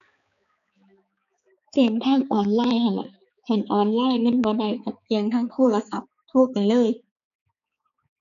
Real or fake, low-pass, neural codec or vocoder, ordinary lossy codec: fake; 7.2 kHz; codec, 16 kHz, 4 kbps, X-Codec, HuBERT features, trained on balanced general audio; none